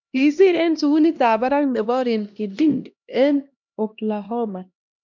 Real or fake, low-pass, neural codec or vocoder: fake; 7.2 kHz; codec, 16 kHz, 1 kbps, X-Codec, HuBERT features, trained on LibriSpeech